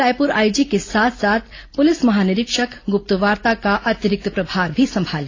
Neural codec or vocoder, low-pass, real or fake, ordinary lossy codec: none; 7.2 kHz; real; AAC, 32 kbps